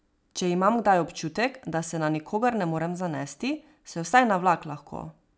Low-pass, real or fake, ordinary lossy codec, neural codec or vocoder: none; real; none; none